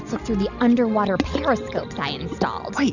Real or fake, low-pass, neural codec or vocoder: real; 7.2 kHz; none